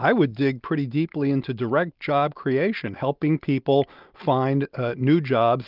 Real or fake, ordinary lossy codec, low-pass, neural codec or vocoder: real; Opus, 32 kbps; 5.4 kHz; none